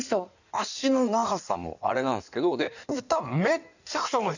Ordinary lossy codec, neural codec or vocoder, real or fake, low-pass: none; codec, 16 kHz in and 24 kHz out, 1.1 kbps, FireRedTTS-2 codec; fake; 7.2 kHz